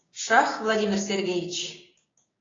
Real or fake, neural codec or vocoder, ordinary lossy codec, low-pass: fake; codec, 16 kHz, 6 kbps, DAC; AAC, 32 kbps; 7.2 kHz